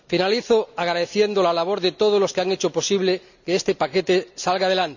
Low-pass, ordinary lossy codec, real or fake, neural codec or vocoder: 7.2 kHz; none; real; none